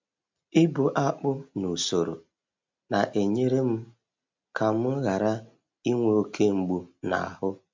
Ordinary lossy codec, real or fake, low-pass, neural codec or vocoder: MP3, 64 kbps; real; 7.2 kHz; none